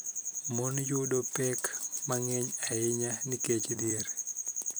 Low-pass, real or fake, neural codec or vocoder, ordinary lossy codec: none; fake; vocoder, 44.1 kHz, 128 mel bands every 256 samples, BigVGAN v2; none